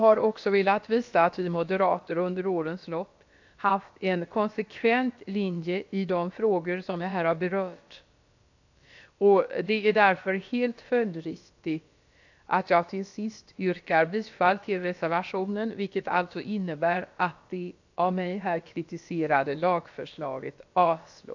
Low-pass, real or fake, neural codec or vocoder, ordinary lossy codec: 7.2 kHz; fake; codec, 16 kHz, about 1 kbps, DyCAST, with the encoder's durations; AAC, 48 kbps